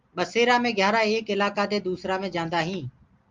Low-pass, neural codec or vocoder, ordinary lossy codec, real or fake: 7.2 kHz; none; Opus, 32 kbps; real